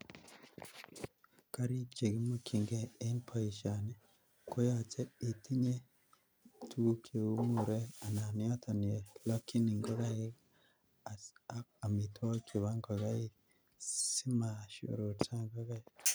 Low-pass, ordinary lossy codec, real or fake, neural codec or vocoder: none; none; real; none